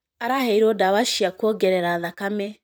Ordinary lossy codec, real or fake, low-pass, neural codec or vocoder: none; fake; none; vocoder, 44.1 kHz, 128 mel bands, Pupu-Vocoder